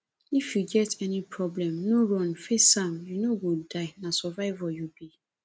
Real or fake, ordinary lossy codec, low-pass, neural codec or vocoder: real; none; none; none